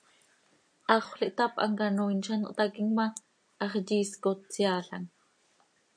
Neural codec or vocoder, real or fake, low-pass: none; real; 9.9 kHz